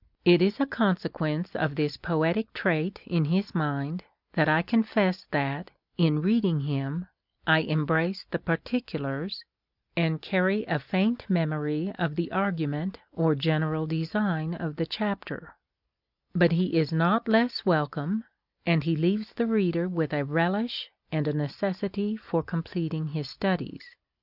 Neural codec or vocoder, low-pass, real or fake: none; 5.4 kHz; real